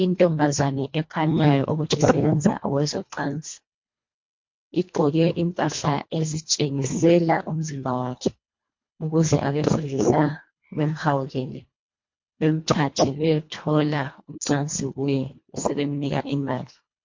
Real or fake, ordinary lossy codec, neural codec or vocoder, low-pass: fake; MP3, 48 kbps; codec, 24 kHz, 1.5 kbps, HILCodec; 7.2 kHz